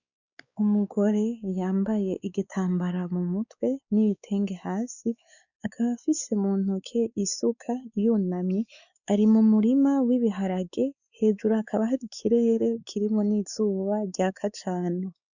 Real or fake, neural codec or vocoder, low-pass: fake; codec, 16 kHz, 4 kbps, X-Codec, WavLM features, trained on Multilingual LibriSpeech; 7.2 kHz